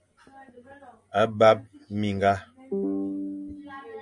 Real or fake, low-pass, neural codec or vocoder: real; 10.8 kHz; none